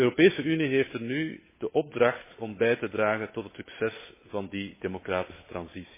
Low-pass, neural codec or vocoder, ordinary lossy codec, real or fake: 3.6 kHz; codec, 16 kHz, 8 kbps, FunCodec, trained on Chinese and English, 25 frames a second; MP3, 16 kbps; fake